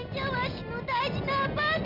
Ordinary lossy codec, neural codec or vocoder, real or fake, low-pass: none; none; real; 5.4 kHz